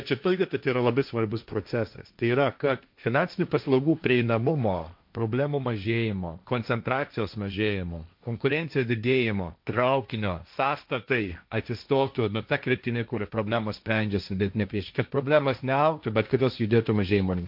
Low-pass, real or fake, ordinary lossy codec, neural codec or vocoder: 5.4 kHz; fake; MP3, 48 kbps; codec, 16 kHz, 1.1 kbps, Voila-Tokenizer